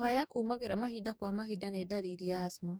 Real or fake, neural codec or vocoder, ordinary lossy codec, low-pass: fake; codec, 44.1 kHz, 2.6 kbps, DAC; none; none